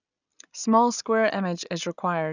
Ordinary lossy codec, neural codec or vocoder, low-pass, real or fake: none; none; 7.2 kHz; real